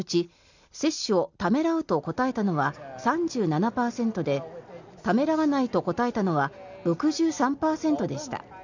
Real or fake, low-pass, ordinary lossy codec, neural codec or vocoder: real; 7.2 kHz; none; none